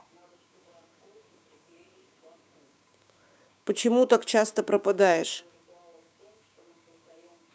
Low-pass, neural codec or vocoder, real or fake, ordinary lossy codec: none; codec, 16 kHz, 6 kbps, DAC; fake; none